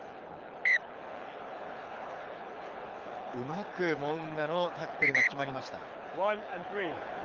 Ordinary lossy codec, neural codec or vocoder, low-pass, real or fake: Opus, 32 kbps; codec, 24 kHz, 6 kbps, HILCodec; 7.2 kHz; fake